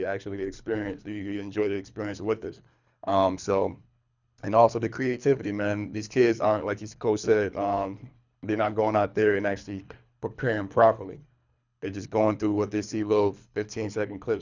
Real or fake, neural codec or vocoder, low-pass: fake; codec, 24 kHz, 3 kbps, HILCodec; 7.2 kHz